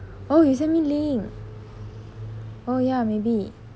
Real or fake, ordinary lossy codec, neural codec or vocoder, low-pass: real; none; none; none